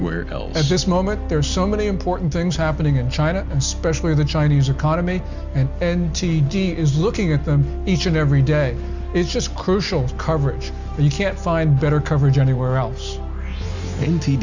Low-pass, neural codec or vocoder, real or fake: 7.2 kHz; none; real